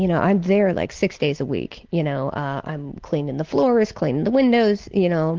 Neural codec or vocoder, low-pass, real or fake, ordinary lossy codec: none; 7.2 kHz; real; Opus, 16 kbps